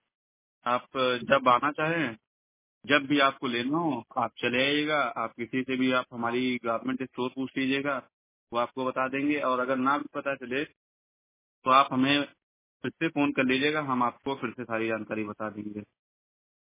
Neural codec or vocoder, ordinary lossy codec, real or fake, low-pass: none; MP3, 16 kbps; real; 3.6 kHz